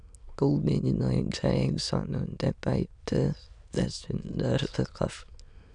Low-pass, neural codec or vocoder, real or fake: 9.9 kHz; autoencoder, 22.05 kHz, a latent of 192 numbers a frame, VITS, trained on many speakers; fake